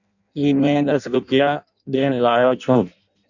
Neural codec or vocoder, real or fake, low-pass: codec, 16 kHz in and 24 kHz out, 0.6 kbps, FireRedTTS-2 codec; fake; 7.2 kHz